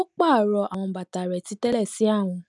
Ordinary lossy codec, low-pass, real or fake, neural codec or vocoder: none; 10.8 kHz; real; none